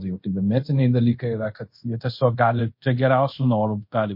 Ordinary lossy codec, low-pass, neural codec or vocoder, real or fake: MP3, 32 kbps; 5.4 kHz; codec, 24 kHz, 0.5 kbps, DualCodec; fake